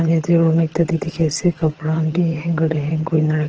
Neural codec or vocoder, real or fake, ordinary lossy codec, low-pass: vocoder, 22.05 kHz, 80 mel bands, HiFi-GAN; fake; Opus, 16 kbps; 7.2 kHz